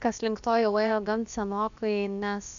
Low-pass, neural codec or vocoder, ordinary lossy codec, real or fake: 7.2 kHz; codec, 16 kHz, about 1 kbps, DyCAST, with the encoder's durations; AAC, 96 kbps; fake